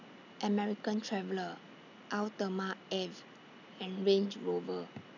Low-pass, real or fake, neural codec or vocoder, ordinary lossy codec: 7.2 kHz; real; none; none